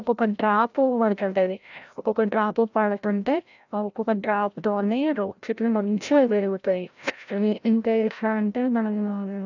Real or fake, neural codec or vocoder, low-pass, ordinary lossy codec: fake; codec, 16 kHz, 0.5 kbps, FreqCodec, larger model; 7.2 kHz; none